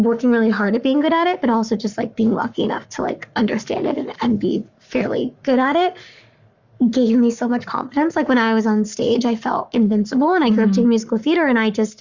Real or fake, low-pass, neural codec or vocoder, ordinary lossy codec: fake; 7.2 kHz; codec, 44.1 kHz, 7.8 kbps, Pupu-Codec; Opus, 64 kbps